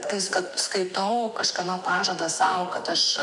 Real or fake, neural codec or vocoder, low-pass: fake; autoencoder, 48 kHz, 32 numbers a frame, DAC-VAE, trained on Japanese speech; 10.8 kHz